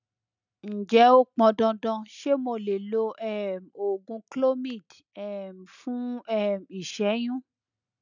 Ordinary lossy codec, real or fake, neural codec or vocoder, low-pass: none; real; none; 7.2 kHz